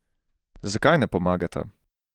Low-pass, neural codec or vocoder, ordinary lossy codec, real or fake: 19.8 kHz; vocoder, 44.1 kHz, 128 mel bands every 512 samples, BigVGAN v2; Opus, 24 kbps; fake